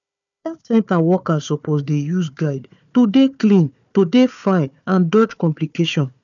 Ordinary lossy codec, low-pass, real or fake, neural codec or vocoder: none; 7.2 kHz; fake; codec, 16 kHz, 4 kbps, FunCodec, trained on Chinese and English, 50 frames a second